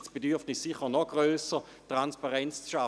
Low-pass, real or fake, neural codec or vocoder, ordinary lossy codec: none; real; none; none